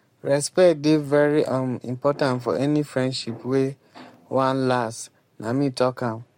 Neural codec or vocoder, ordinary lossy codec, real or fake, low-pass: codec, 44.1 kHz, 7.8 kbps, Pupu-Codec; MP3, 64 kbps; fake; 19.8 kHz